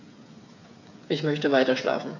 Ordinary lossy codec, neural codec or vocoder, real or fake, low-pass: AAC, 48 kbps; codec, 16 kHz, 8 kbps, FreqCodec, smaller model; fake; 7.2 kHz